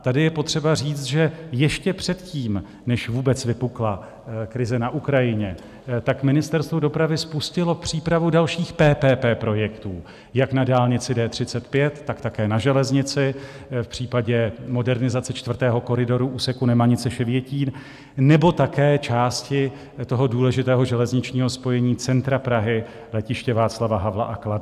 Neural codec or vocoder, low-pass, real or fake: none; 14.4 kHz; real